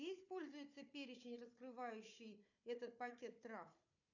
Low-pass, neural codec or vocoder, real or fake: 7.2 kHz; codec, 16 kHz, 4 kbps, FunCodec, trained on Chinese and English, 50 frames a second; fake